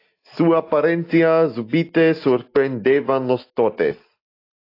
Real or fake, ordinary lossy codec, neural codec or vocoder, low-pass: real; AAC, 32 kbps; none; 5.4 kHz